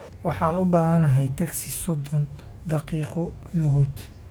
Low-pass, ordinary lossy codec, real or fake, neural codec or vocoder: none; none; fake; codec, 44.1 kHz, 2.6 kbps, DAC